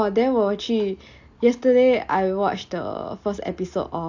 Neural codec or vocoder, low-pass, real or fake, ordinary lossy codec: none; 7.2 kHz; real; AAC, 48 kbps